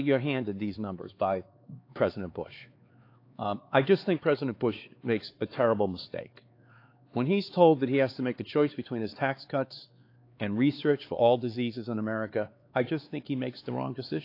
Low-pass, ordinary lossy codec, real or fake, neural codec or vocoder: 5.4 kHz; AAC, 32 kbps; fake; codec, 16 kHz, 4 kbps, X-Codec, HuBERT features, trained on LibriSpeech